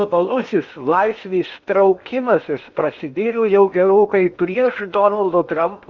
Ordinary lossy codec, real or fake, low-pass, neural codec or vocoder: Opus, 64 kbps; fake; 7.2 kHz; codec, 16 kHz in and 24 kHz out, 0.8 kbps, FocalCodec, streaming, 65536 codes